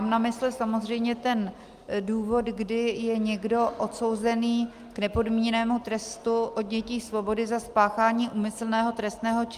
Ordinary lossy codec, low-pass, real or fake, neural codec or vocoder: Opus, 32 kbps; 14.4 kHz; real; none